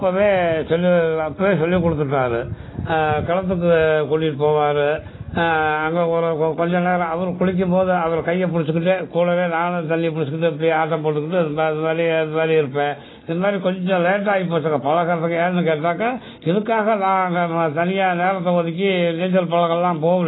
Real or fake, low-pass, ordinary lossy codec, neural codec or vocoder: real; 7.2 kHz; AAC, 16 kbps; none